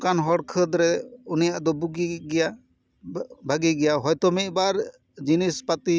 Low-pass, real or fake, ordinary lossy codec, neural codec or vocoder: none; real; none; none